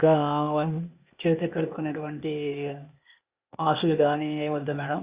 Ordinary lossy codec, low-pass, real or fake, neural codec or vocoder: Opus, 64 kbps; 3.6 kHz; fake; codec, 16 kHz, 0.8 kbps, ZipCodec